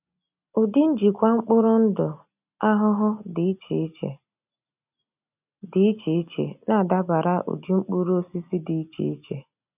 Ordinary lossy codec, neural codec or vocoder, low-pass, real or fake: none; none; 3.6 kHz; real